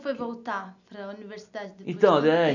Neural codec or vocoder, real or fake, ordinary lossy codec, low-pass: none; real; none; 7.2 kHz